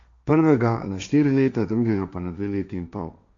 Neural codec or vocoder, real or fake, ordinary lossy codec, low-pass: codec, 16 kHz, 1.1 kbps, Voila-Tokenizer; fake; AAC, 64 kbps; 7.2 kHz